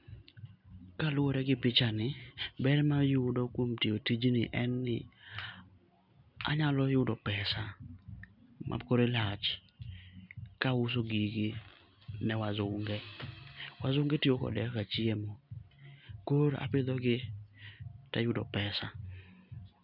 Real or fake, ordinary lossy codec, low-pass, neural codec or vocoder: real; none; 5.4 kHz; none